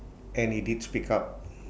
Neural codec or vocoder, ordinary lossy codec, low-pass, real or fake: none; none; none; real